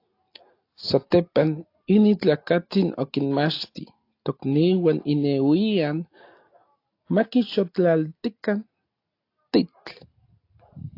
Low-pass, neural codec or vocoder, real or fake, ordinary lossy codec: 5.4 kHz; none; real; AAC, 32 kbps